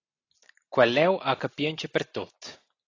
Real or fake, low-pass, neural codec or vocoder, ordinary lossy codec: real; 7.2 kHz; none; AAC, 32 kbps